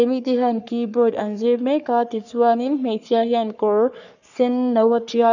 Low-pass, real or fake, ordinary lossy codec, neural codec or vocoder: 7.2 kHz; fake; none; codec, 44.1 kHz, 3.4 kbps, Pupu-Codec